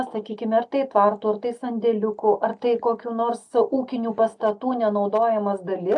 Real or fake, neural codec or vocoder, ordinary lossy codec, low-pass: real; none; AAC, 64 kbps; 10.8 kHz